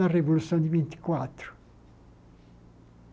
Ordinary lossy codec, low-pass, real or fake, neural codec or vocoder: none; none; real; none